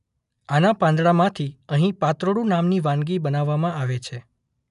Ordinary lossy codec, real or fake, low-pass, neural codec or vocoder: none; real; 10.8 kHz; none